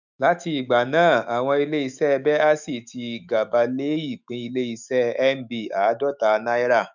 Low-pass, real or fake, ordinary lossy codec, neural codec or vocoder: 7.2 kHz; fake; none; autoencoder, 48 kHz, 128 numbers a frame, DAC-VAE, trained on Japanese speech